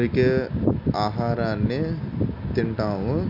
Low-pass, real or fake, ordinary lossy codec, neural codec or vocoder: 5.4 kHz; real; MP3, 48 kbps; none